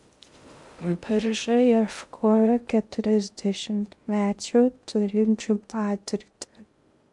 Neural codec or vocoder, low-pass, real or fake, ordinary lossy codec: codec, 16 kHz in and 24 kHz out, 0.6 kbps, FocalCodec, streaming, 2048 codes; 10.8 kHz; fake; MP3, 64 kbps